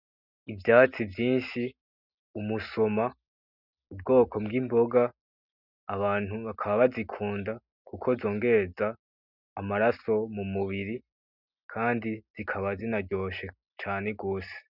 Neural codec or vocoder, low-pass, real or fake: none; 5.4 kHz; real